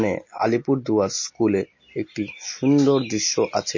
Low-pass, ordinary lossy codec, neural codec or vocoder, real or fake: 7.2 kHz; MP3, 32 kbps; none; real